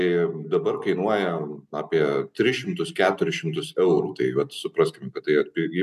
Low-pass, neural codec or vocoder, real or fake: 14.4 kHz; none; real